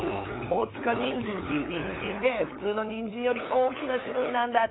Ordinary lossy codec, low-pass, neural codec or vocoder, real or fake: AAC, 16 kbps; 7.2 kHz; codec, 16 kHz, 8 kbps, FunCodec, trained on LibriTTS, 25 frames a second; fake